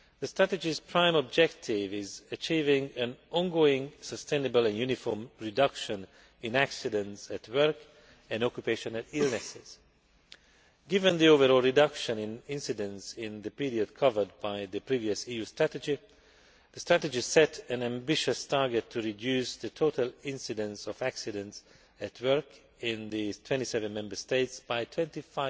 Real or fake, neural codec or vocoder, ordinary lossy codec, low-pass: real; none; none; none